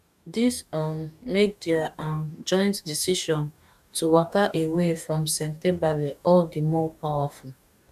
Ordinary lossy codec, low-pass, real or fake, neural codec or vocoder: none; 14.4 kHz; fake; codec, 44.1 kHz, 2.6 kbps, DAC